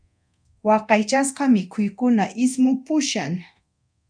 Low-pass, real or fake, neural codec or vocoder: 9.9 kHz; fake; codec, 24 kHz, 0.9 kbps, DualCodec